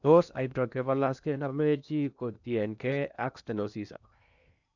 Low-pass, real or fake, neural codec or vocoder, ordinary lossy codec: 7.2 kHz; fake; codec, 16 kHz in and 24 kHz out, 0.8 kbps, FocalCodec, streaming, 65536 codes; none